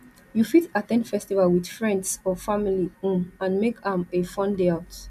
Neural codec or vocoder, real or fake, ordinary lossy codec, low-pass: none; real; none; 14.4 kHz